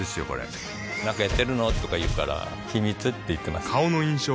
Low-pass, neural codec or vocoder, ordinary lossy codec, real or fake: none; none; none; real